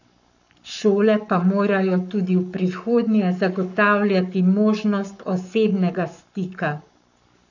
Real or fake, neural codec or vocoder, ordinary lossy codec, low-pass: fake; codec, 44.1 kHz, 7.8 kbps, Pupu-Codec; none; 7.2 kHz